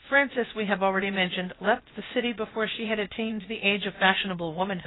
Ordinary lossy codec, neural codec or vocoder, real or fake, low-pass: AAC, 16 kbps; codec, 16 kHz, about 1 kbps, DyCAST, with the encoder's durations; fake; 7.2 kHz